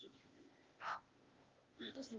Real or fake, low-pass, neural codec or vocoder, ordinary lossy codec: fake; 7.2 kHz; codec, 16 kHz, 0.8 kbps, ZipCodec; Opus, 32 kbps